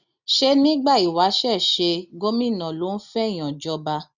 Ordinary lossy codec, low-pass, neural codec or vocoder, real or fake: none; 7.2 kHz; none; real